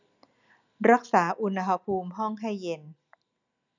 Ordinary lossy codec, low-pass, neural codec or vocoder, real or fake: none; 7.2 kHz; none; real